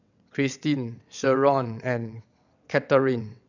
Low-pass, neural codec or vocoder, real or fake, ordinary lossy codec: 7.2 kHz; vocoder, 22.05 kHz, 80 mel bands, WaveNeXt; fake; none